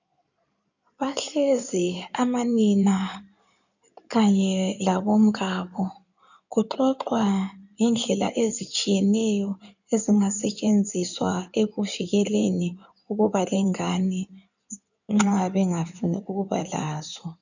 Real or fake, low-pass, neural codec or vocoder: fake; 7.2 kHz; codec, 16 kHz in and 24 kHz out, 2.2 kbps, FireRedTTS-2 codec